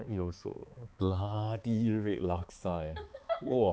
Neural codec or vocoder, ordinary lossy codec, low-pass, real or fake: codec, 16 kHz, 4 kbps, X-Codec, HuBERT features, trained on balanced general audio; none; none; fake